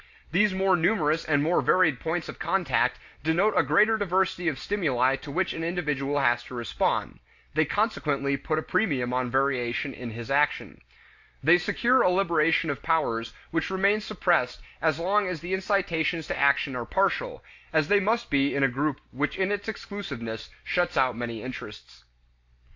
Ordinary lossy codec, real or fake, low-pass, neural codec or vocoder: AAC, 48 kbps; real; 7.2 kHz; none